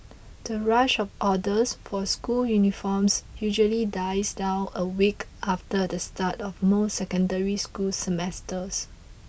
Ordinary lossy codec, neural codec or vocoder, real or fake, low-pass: none; none; real; none